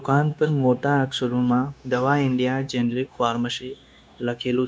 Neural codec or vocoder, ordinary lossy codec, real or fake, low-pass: codec, 16 kHz, 0.9 kbps, LongCat-Audio-Codec; none; fake; none